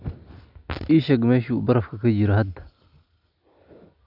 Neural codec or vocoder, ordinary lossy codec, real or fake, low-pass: none; none; real; 5.4 kHz